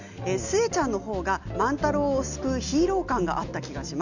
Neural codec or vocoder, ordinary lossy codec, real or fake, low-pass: none; none; real; 7.2 kHz